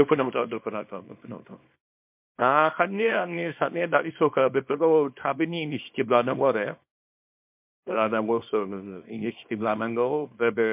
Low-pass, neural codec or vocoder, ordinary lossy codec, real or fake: 3.6 kHz; codec, 24 kHz, 0.9 kbps, WavTokenizer, small release; MP3, 32 kbps; fake